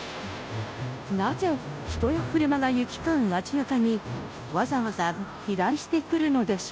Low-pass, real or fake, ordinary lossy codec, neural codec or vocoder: none; fake; none; codec, 16 kHz, 0.5 kbps, FunCodec, trained on Chinese and English, 25 frames a second